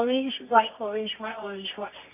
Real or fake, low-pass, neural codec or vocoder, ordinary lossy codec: fake; 3.6 kHz; codec, 24 kHz, 0.9 kbps, WavTokenizer, medium music audio release; none